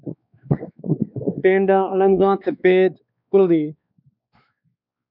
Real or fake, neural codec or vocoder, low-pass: fake; codec, 16 kHz, 2 kbps, X-Codec, WavLM features, trained on Multilingual LibriSpeech; 5.4 kHz